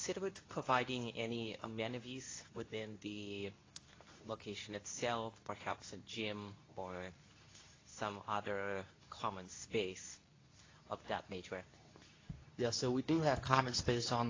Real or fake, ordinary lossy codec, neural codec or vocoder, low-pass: fake; AAC, 32 kbps; codec, 24 kHz, 0.9 kbps, WavTokenizer, medium speech release version 2; 7.2 kHz